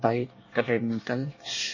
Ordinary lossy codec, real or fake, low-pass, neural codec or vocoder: MP3, 32 kbps; fake; 7.2 kHz; codec, 24 kHz, 1 kbps, SNAC